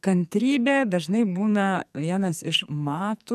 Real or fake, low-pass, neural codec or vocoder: fake; 14.4 kHz; codec, 44.1 kHz, 2.6 kbps, SNAC